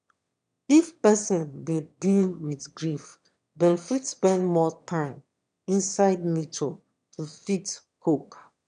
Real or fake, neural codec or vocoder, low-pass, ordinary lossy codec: fake; autoencoder, 22.05 kHz, a latent of 192 numbers a frame, VITS, trained on one speaker; 9.9 kHz; none